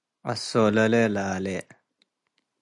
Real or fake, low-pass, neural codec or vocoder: real; 10.8 kHz; none